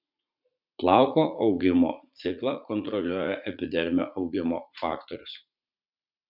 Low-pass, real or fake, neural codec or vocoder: 5.4 kHz; fake; vocoder, 44.1 kHz, 80 mel bands, Vocos